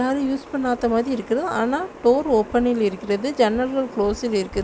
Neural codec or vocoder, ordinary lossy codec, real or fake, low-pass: none; none; real; none